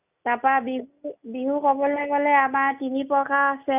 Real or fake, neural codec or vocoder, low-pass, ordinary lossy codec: real; none; 3.6 kHz; none